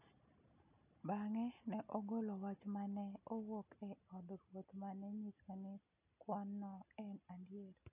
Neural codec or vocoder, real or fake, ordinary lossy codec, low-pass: none; real; none; 3.6 kHz